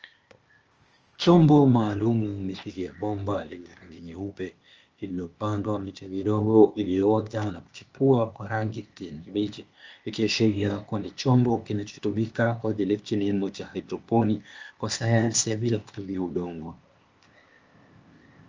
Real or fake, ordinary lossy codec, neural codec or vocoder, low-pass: fake; Opus, 24 kbps; codec, 16 kHz, 0.8 kbps, ZipCodec; 7.2 kHz